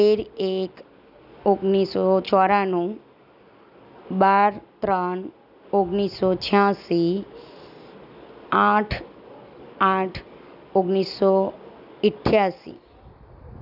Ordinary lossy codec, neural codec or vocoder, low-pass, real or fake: none; none; 5.4 kHz; real